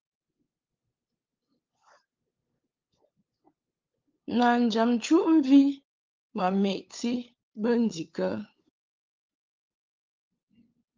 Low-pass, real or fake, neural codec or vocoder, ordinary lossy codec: 7.2 kHz; fake; codec, 16 kHz, 8 kbps, FunCodec, trained on LibriTTS, 25 frames a second; Opus, 24 kbps